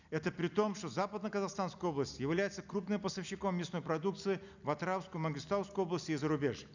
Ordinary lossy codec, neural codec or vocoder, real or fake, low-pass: none; none; real; 7.2 kHz